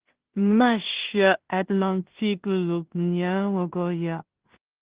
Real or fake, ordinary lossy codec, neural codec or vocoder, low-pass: fake; Opus, 16 kbps; codec, 16 kHz in and 24 kHz out, 0.4 kbps, LongCat-Audio-Codec, two codebook decoder; 3.6 kHz